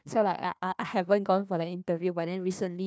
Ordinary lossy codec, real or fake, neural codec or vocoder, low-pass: none; fake; codec, 16 kHz, 1 kbps, FunCodec, trained on Chinese and English, 50 frames a second; none